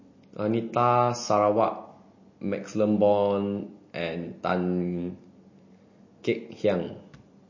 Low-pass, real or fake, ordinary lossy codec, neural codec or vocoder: 7.2 kHz; real; MP3, 32 kbps; none